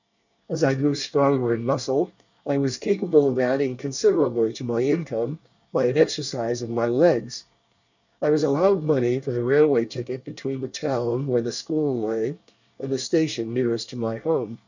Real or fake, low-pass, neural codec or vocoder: fake; 7.2 kHz; codec, 24 kHz, 1 kbps, SNAC